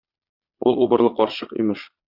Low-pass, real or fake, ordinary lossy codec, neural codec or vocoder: 5.4 kHz; fake; Opus, 64 kbps; vocoder, 22.05 kHz, 80 mel bands, Vocos